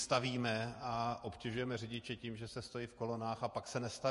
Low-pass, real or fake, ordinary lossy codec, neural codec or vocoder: 14.4 kHz; fake; MP3, 48 kbps; vocoder, 48 kHz, 128 mel bands, Vocos